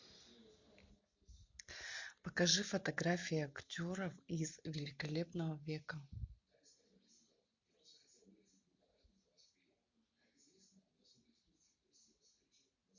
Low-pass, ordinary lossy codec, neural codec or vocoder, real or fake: 7.2 kHz; MP3, 48 kbps; none; real